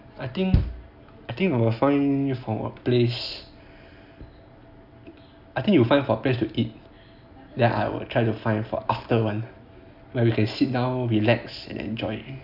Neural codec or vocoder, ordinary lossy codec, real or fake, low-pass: none; none; real; 5.4 kHz